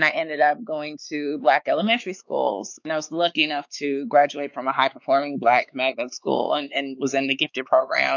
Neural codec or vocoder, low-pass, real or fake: codec, 16 kHz, 4 kbps, X-Codec, WavLM features, trained on Multilingual LibriSpeech; 7.2 kHz; fake